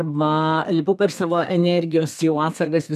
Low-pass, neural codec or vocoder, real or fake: 14.4 kHz; codec, 32 kHz, 1.9 kbps, SNAC; fake